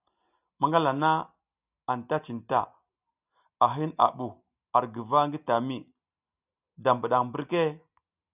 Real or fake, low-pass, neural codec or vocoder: real; 3.6 kHz; none